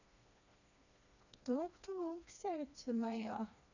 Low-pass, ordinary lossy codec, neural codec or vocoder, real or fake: 7.2 kHz; none; codec, 16 kHz, 2 kbps, FreqCodec, smaller model; fake